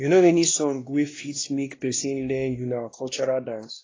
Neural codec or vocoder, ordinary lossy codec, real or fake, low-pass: codec, 16 kHz, 1 kbps, X-Codec, WavLM features, trained on Multilingual LibriSpeech; AAC, 32 kbps; fake; 7.2 kHz